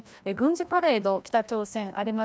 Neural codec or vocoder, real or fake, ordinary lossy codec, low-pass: codec, 16 kHz, 1 kbps, FreqCodec, larger model; fake; none; none